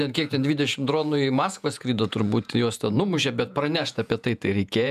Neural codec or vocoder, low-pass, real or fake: vocoder, 48 kHz, 128 mel bands, Vocos; 14.4 kHz; fake